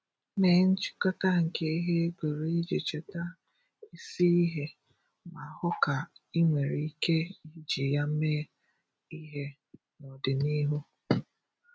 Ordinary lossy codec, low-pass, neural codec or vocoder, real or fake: none; none; none; real